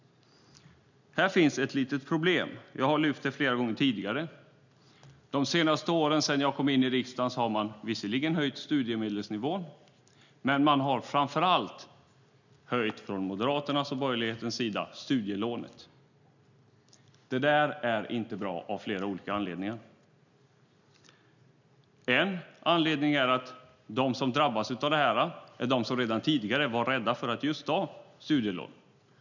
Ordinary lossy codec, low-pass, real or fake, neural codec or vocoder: none; 7.2 kHz; real; none